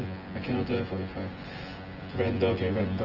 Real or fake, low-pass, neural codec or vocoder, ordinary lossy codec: fake; 5.4 kHz; vocoder, 24 kHz, 100 mel bands, Vocos; Opus, 16 kbps